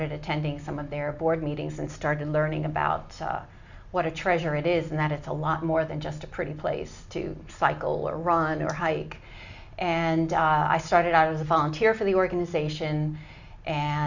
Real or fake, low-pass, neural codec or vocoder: real; 7.2 kHz; none